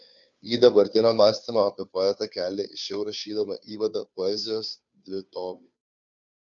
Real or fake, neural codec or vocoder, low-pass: fake; codec, 16 kHz, 2 kbps, FunCodec, trained on Chinese and English, 25 frames a second; 7.2 kHz